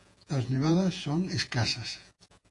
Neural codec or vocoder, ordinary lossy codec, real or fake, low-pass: vocoder, 48 kHz, 128 mel bands, Vocos; AAC, 48 kbps; fake; 10.8 kHz